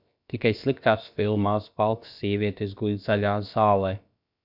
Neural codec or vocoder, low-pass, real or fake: codec, 16 kHz, about 1 kbps, DyCAST, with the encoder's durations; 5.4 kHz; fake